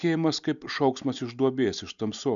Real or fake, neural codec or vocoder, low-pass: real; none; 7.2 kHz